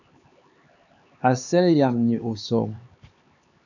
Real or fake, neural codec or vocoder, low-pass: fake; codec, 16 kHz, 4 kbps, X-Codec, HuBERT features, trained on LibriSpeech; 7.2 kHz